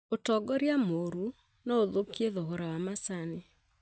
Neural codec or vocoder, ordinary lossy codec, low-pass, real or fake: none; none; none; real